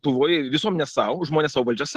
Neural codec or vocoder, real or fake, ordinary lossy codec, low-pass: none; real; Opus, 16 kbps; 14.4 kHz